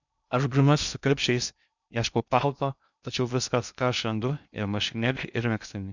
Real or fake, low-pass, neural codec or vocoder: fake; 7.2 kHz; codec, 16 kHz in and 24 kHz out, 0.6 kbps, FocalCodec, streaming, 2048 codes